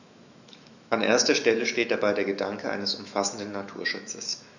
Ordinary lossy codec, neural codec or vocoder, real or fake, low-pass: none; autoencoder, 48 kHz, 128 numbers a frame, DAC-VAE, trained on Japanese speech; fake; 7.2 kHz